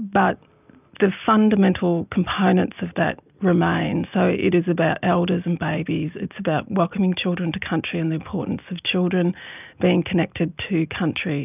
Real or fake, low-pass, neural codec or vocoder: real; 3.6 kHz; none